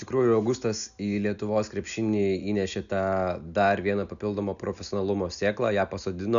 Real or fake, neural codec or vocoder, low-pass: real; none; 7.2 kHz